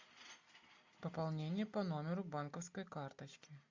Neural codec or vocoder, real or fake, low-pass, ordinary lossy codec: none; real; 7.2 kHz; MP3, 64 kbps